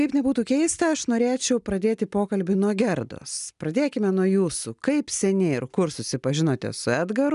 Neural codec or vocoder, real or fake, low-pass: none; real; 10.8 kHz